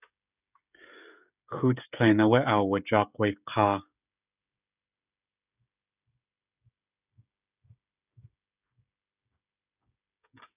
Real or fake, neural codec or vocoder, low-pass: fake; codec, 16 kHz, 16 kbps, FreqCodec, smaller model; 3.6 kHz